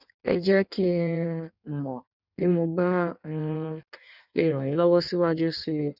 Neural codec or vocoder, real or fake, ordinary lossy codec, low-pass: codec, 16 kHz in and 24 kHz out, 1.1 kbps, FireRedTTS-2 codec; fake; none; 5.4 kHz